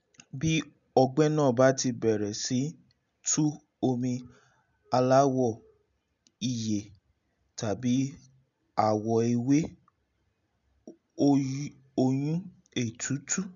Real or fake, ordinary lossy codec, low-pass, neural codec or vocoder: real; none; 7.2 kHz; none